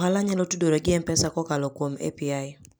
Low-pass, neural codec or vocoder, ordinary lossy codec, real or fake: none; none; none; real